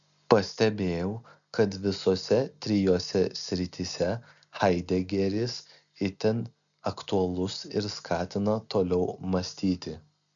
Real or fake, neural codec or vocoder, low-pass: real; none; 7.2 kHz